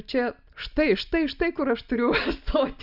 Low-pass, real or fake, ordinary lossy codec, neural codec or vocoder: 5.4 kHz; real; Opus, 64 kbps; none